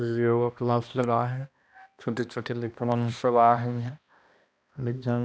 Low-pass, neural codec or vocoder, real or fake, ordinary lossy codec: none; codec, 16 kHz, 1 kbps, X-Codec, HuBERT features, trained on balanced general audio; fake; none